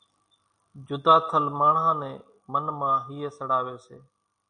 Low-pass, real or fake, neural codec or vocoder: 9.9 kHz; real; none